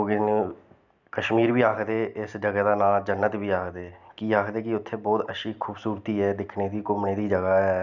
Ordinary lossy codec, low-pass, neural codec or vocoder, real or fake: none; 7.2 kHz; none; real